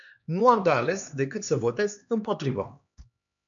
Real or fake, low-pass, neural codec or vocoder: fake; 7.2 kHz; codec, 16 kHz, 2 kbps, X-Codec, HuBERT features, trained on LibriSpeech